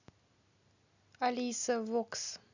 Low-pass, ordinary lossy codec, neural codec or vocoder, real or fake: 7.2 kHz; none; none; real